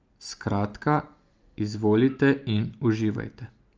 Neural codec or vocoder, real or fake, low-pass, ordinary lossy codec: none; real; 7.2 kHz; Opus, 24 kbps